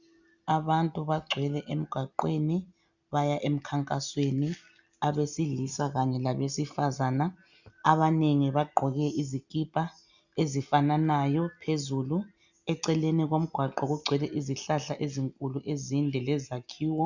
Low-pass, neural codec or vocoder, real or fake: 7.2 kHz; none; real